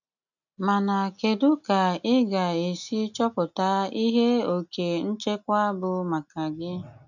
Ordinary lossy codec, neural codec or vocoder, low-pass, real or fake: none; none; 7.2 kHz; real